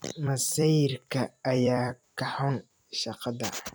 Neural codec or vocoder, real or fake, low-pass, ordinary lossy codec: vocoder, 44.1 kHz, 128 mel bands every 256 samples, BigVGAN v2; fake; none; none